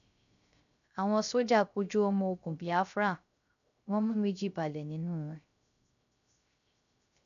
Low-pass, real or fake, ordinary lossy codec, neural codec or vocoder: 7.2 kHz; fake; none; codec, 16 kHz, 0.3 kbps, FocalCodec